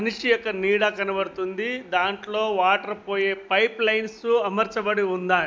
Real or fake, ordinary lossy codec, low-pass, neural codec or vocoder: real; none; none; none